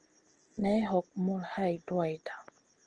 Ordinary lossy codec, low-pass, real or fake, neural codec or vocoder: Opus, 16 kbps; 9.9 kHz; real; none